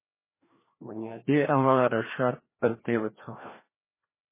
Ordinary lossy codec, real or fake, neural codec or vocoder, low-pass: MP3, 16 kbps; fake; codec, 16 kHz, 1 kbps, FreqCodec, larger model; 3.6 kHz